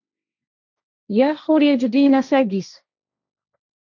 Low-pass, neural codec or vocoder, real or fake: 7.2 kHz; codec, 16 kHz, 1.1 kbps, Voila-Tokenizer; fake